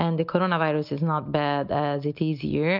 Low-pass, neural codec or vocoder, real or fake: 5.4 kHz; none; real